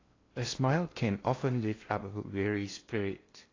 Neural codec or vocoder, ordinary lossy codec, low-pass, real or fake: codec, 16 kHz in and 24 kHz out, 0.6 kbps, FocalCodec, streaming, 2048 codes; AAC, 32 kbps; 7.2 kHz; fake